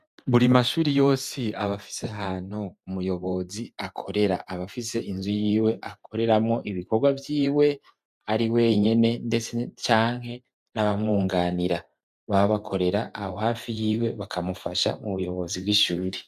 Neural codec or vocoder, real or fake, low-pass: vocoder, 44.1 kHz, 128 mel bands every 512 samples, BigVGAN v2; fake; 14.4 kHz